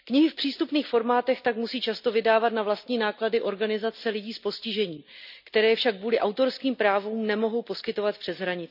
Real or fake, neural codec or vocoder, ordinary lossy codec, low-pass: real; none; none; 5.4 kHz